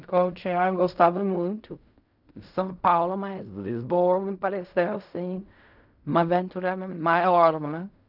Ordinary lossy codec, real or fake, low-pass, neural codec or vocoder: none; fake; 5.4 kHz; codec, 16 kHz in and 24 kHz out, 0.4 kbps, LongCat-Audio-Codec, fine tuned four codebook decoder